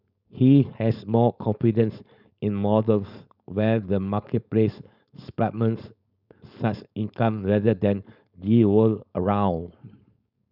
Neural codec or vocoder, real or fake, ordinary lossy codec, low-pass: codec, 16 kHz, 4.8 kbps, FACodec; fake; none; 5.4 kHz